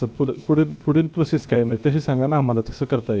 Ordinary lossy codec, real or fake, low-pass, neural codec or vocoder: none; fake; none; codec, 16 kHz, about 1 kbps, DyCAST, with the encoder's durations